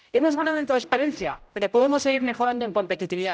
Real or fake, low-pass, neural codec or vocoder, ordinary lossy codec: fake; none; codec, 16 kHz, 0.5 kbps, X-Codec, HuBERT features, trained on general audio; none